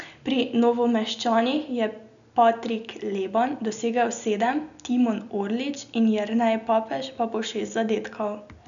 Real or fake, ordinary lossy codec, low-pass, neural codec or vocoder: real; none; 7.2 kHz; none